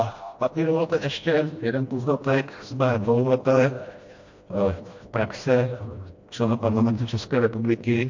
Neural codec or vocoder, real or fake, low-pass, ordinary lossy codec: codec, 16 kHz, 1 kbps, FreqCodec, smaller model; fake; 7.2 kHz; MP3, 48 kbps